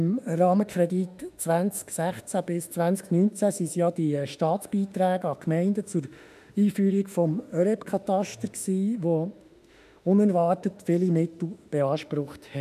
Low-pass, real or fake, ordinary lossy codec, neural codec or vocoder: 14.4 kHz; fake; none; autoencoder, 48 kHz, 32 numbers a frame, DAC-VAE, trained on Japanese speech